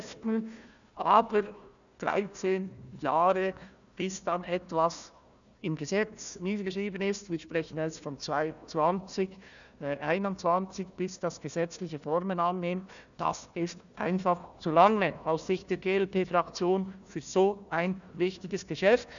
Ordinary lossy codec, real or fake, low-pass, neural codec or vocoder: none; fake; 7.2 kHz; codec, 16 kHz, 1 kbps, FunCodec, trained on Chinese and English, 50 frames a second